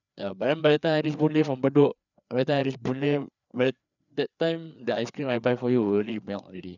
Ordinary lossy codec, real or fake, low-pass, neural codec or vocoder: none; fake; 7.2 kHz; codec, 16 kHz, 4 kbps, FreqCodec, larger model